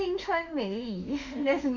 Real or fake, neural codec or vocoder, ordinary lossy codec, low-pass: fake; autoencoder, 48 kHz, 32 numbers a frame, DAC-VAE, trained on Japanese speech; none; 7.2 kHz